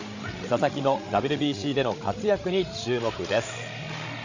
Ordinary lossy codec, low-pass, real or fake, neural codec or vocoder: AAC, 48 kbps; 7.2 kHz; fake; codec, 16 kHz, 16 kbps, FreqCodec, larger model